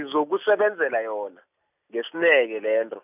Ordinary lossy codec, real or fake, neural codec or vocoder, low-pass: none; real; none; 3.6 kHz